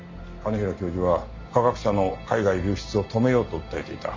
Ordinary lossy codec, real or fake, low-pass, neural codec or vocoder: MP3, 48 kbps; real; 7.2 kHz; none